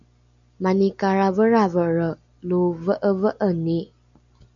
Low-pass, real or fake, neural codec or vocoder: 7.2 kHz; real; none